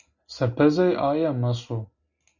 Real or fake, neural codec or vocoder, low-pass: real; none; 7.2 kHz